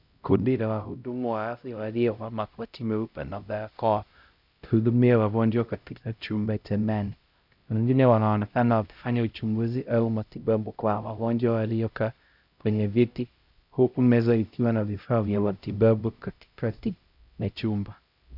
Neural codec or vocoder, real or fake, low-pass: codec, 16 kHz, 0.5 kbps, X-Codec, HuBERT features, trained on LibriSpeech; fake; 5.4 kHz